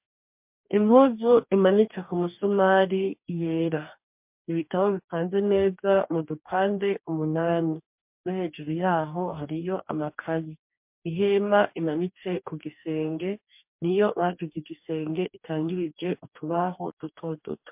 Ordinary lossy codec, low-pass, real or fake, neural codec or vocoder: MP3, 32 kbps; 3.6 kHz; fake; codec, 44.1 kHz, 2.6 kbps, DAC